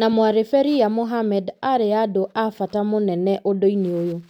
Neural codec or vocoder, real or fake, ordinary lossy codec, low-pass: none; real; none; 19.8 kHz